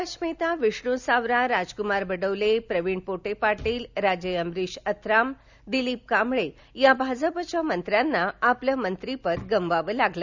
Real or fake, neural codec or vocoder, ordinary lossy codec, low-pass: real; none; none; 7.2 kHz